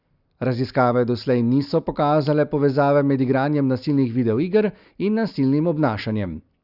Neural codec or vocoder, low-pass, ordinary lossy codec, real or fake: none; 5.4 kHz; Opus, 64 kbps; real